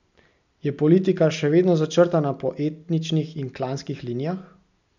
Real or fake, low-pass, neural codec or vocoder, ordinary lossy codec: real; 7.2 kHz; none; none